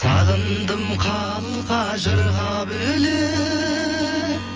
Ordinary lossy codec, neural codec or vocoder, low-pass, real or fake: Opus, 24 kbps; vocoder, 24 kHz, 100 mel bands, Vocos; 7.2 kHz; fake